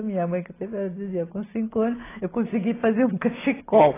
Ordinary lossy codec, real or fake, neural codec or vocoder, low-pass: AAC, 16 kbps; real; none; 3.6 kHz